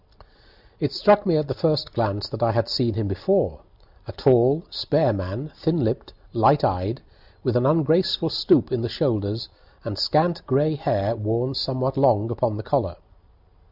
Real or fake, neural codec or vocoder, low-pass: real; none; 5.4 kHz